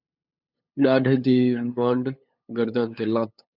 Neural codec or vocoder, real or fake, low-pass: codec, 16 kHz, 8 kbps, FunCodec, trained on LibriTTS, 25 frames a second; fake; 5.4 kHz